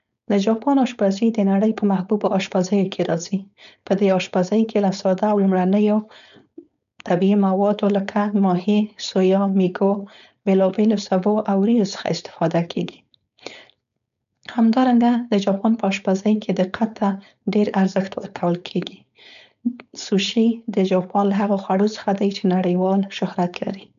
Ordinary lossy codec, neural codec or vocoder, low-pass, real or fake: none; codec, 16 kHz, 4.8 kbps, FACodec; 7.2 kHz; fake